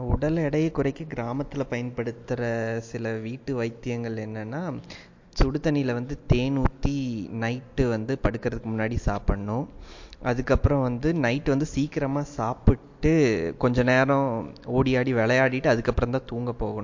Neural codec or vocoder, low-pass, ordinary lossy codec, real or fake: none; 7.2 kHz; MP3, 48 kbps; real